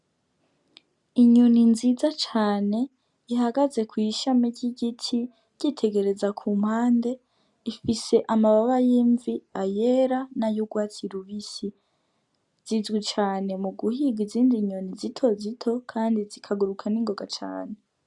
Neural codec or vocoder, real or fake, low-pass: none; real; 10.8 kHz